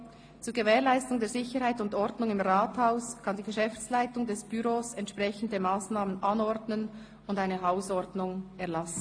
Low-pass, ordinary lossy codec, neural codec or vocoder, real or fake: 9.9 kHz; AAC, 48 kbps; none; real